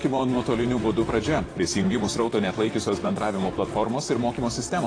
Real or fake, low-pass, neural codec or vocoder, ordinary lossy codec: fake; 9.9 kHz; vocoder, 44.1 kHz, 128 mel bands, Pupu-Vocoder; AAC, 32 kbps